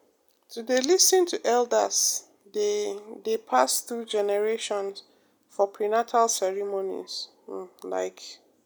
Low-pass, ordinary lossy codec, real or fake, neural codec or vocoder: none; none; real; none